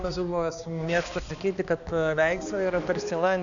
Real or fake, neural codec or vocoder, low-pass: fake; codec, 16 kHz, 2 kbps, X-Codec, HuBERT features, trained on balanced general audio; 7.2 kHz